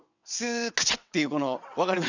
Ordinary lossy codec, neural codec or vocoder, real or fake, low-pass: none; vocoder, 22.05 kHz, 80 mel bands, WaveNeXt; fake; 7.2 kHz